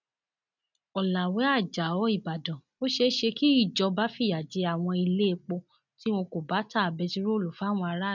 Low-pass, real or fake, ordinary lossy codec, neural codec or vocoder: 7.2 kHz; real; none; none